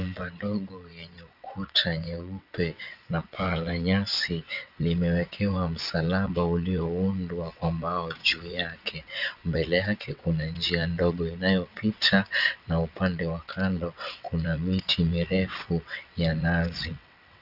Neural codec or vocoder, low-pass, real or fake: vocoder, 22.05 kHz, 80 mel bands, WaveNeXt; 5.4 kHz; fake